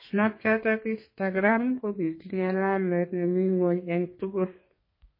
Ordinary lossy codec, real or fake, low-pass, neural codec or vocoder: MP3, 24 kbps; fake; 5.4 kHz; codec, 32 kHz, 1.9 kbps, SNAC